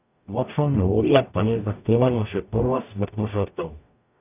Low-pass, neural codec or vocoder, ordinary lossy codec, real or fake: 3.6 kHz; codec, 44.1 kHz, 0.9 kbps, DAC; none; fake